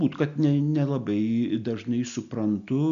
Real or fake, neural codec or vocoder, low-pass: real; none; 7.2 kHz